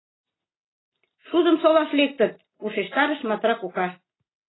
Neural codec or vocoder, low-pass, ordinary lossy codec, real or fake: none; 7.2 kHz; AAC, 16 kbps; real